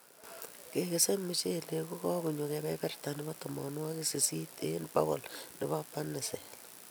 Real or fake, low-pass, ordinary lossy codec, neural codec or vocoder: real; none; none; none